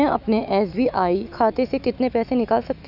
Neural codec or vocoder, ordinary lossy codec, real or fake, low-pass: autoencoder, 48 kHz, 128 numbers a frame, DAC-VAE, trained on Japanese speech; none; fake; 5.4 kHz